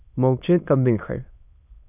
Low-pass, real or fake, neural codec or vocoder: 3.6 kHz; fake; autoencoder, 22.05 kHz, a latent of 192 numbers a frame, VITS, trained on many speakers